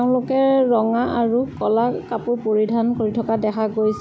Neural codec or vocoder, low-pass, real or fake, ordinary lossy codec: none; none; real; none